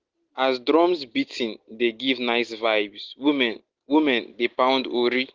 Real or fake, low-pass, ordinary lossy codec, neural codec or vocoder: real; 7.2 kHz; Opus, 16 kbps; none